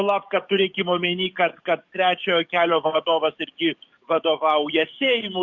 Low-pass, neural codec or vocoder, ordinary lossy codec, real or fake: 7.2 kHz; codec, 44.1 kHz, 7.8 kbps, DAC; Opus, 64 kbps; fake